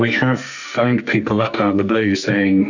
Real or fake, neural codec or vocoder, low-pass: fake; codec, 44.1 kHz, 2.6 kbps, SNAC; 7.2 kHz